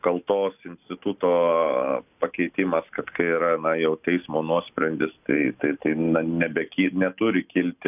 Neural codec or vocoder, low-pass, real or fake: none; 3.6 kHz; real